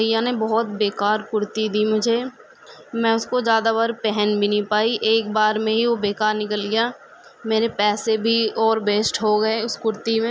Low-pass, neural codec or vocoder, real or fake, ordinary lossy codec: none; none; real; none